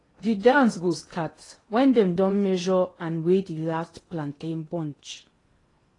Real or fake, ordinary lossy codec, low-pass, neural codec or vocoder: fake; AAC, 32 kbps; 10.8 kHz; codec, 16 kHz in and 24 kHz out, 0.8 kbps, FocalCodec, streaming, 65536 codes